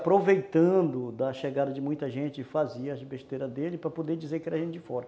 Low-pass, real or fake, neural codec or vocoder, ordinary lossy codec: none; real; none; none